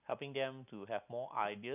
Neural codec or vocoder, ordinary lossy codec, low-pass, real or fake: none; none; 3.6 kHz; real